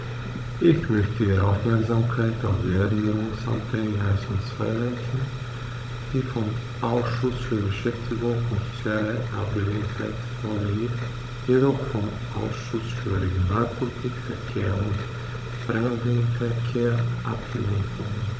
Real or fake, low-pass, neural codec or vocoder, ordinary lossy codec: fake; none; codec, 16 kHz, 16 kbps, FunCodec, trained on Chinese and English, 50 frames a second; none